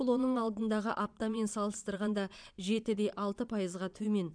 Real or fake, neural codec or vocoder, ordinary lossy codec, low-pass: fake; vocoder, 22.05 kHz, 80 mel bands, Vocos; none; 9.9 kHz